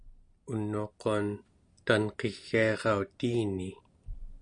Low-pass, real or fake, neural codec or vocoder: 9.9 kHz; real; none